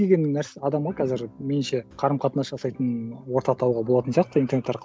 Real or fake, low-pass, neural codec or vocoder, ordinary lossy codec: real; none; none; none